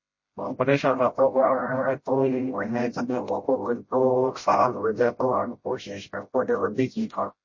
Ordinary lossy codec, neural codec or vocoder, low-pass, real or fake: MP3, 32 kbps; codec, 16 kHz, 0.5 kbps, FreqCodec, smaller model; 7.2 kHz; fake